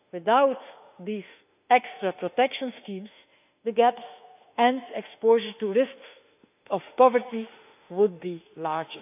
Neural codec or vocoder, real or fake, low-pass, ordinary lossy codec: autoencoder, 48 kHz, 32 numbers a frame, DAC-VAE, trained on Japanese speech; fake; 3.6 kHz; none